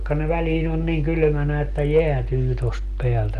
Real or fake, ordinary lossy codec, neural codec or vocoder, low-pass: real; Opus, 24 kbps; none; 14.4 kHz